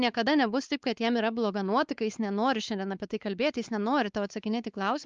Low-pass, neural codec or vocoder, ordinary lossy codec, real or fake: 7.2 kHz; codec, 16 kHz, 4 kbps, X-Codec, WavLM features, trained on Multilingual LibriSpeech; Opus, 24 kbps; fake